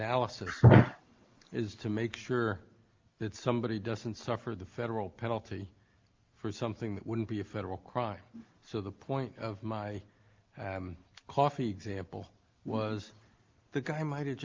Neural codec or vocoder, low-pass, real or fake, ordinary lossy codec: none; 7.2 kHz; real; Opus, 32 kbps